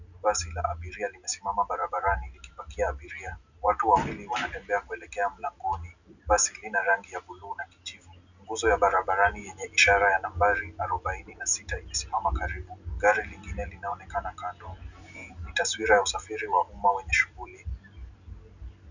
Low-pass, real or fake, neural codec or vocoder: 7.2 kHz; real; none